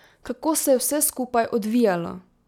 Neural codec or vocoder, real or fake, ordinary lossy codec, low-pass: none; real; none; 19.8 kHz